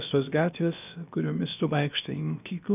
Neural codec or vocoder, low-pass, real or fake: codec, 16 kHz, about 1 kbps, DyCAST, with the encoder's durations; 3.6 kHz; fake